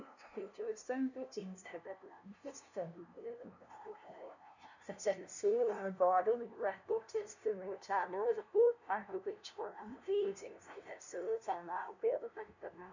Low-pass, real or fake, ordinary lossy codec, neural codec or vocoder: 7.2 kHz; fake; none; codec, 16 kHz, 0.5 kbps, FunCodec, trained on LibriTTS, 25 frames a second